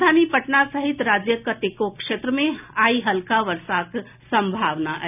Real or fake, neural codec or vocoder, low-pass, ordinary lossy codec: real; none; 3.6 kHz; none